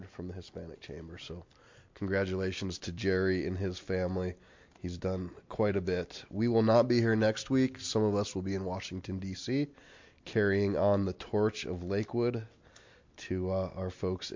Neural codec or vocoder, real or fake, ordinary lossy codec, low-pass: none; real; MP3, 48 kbps; 7.2 kHz